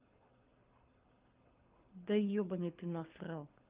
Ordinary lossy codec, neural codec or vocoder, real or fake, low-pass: Opus, 32 kbps; codec, 24 kHz, 6 kbps, HILCodec; fake; 3.6 kHz